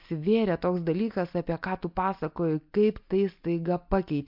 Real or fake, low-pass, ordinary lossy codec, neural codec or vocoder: real; 5.4 kHz; MP3, 48 kbps; none